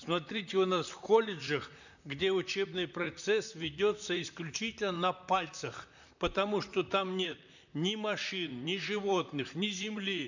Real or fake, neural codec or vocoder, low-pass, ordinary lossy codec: fake; vocoder, 44.1 kHz, 128 mel bands, Pupu-Vocoder; 7.2 kHz; none